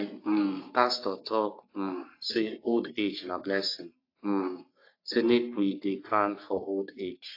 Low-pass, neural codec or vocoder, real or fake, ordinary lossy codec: 5.4 kHz; codec, 44.1 kHz, 3.4 kbps, Pupu-Codec; fake; AAC, 32 kbps